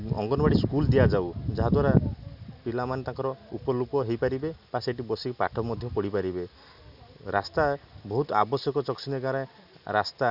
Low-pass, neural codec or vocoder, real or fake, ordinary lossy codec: 5.4 kHz; none; real; none